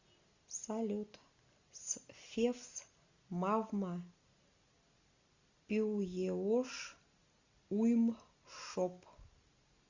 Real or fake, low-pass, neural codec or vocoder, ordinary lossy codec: real; 7.2 kHz; none; Opus, 64 kbps